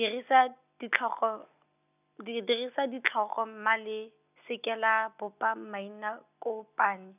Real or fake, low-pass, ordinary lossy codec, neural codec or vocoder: real; 3.6 kHz; none; none